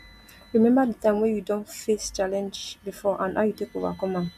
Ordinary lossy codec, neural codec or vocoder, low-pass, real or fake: none; none; 14.4 kHz; real